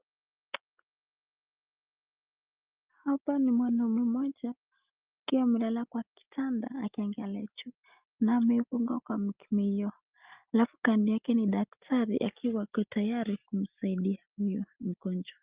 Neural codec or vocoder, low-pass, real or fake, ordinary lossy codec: none; 3.6 kHz; real; Opus, 32 kbps